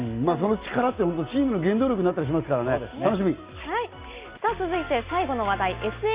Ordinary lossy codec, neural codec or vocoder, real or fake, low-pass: Opus, 64 kbps; none; real; 3.6 kHz